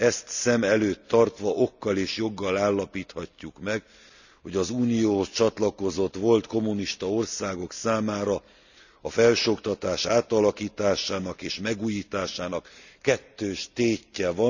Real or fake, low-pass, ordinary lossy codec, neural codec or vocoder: real; 7.2 kHz; none; none